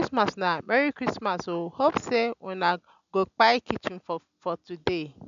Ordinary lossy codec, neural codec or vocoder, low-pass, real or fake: none; none; 7.2 kHz; real